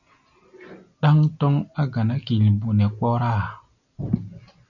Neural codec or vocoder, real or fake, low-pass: none; real; 7.2 kHz